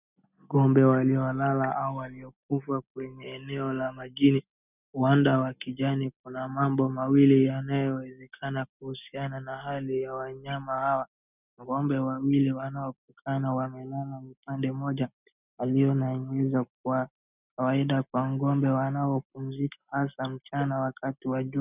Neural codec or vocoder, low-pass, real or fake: none; 3.6 kHz; real